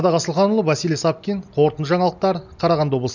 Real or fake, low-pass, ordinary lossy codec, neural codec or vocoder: real; 7.2 kHz; none; none